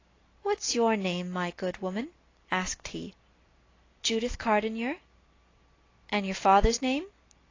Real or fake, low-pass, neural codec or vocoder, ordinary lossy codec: real; 7.2 kHz; none; AAC, 32 kbps